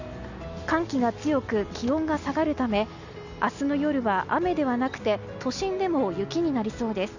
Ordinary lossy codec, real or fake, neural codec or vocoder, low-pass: none; real; none; 7.2 kHz